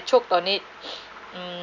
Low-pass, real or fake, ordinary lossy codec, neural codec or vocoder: 7.2 kHz; real; none; none